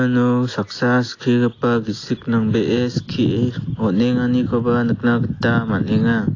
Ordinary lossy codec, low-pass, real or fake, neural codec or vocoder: AAC, 32 kbps; 7.2 kHz; real; none